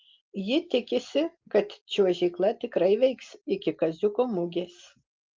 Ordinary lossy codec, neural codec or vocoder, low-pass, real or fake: Opus, 32 kbps; none; 7.2 kHz; real